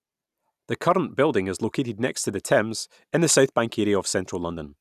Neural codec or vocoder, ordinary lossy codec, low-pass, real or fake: none; none; 14.4 kHz; real